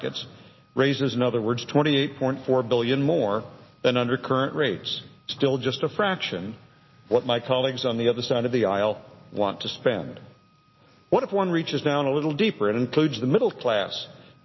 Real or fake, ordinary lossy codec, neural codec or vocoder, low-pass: real; MP3, 24 kbps; none; 7.2 kHz